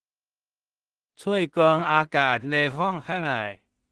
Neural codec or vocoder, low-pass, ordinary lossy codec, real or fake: codec, 16 kHz in and 24 kHz out, 0.4 kbps, LongCat-Audio-Codec, two codebook decoder; 10.8 kHz; Opus, 16 kbps; fake